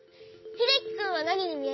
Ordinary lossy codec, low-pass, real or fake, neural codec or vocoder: MP3, 24 kbps; 7.2 kHz; real; none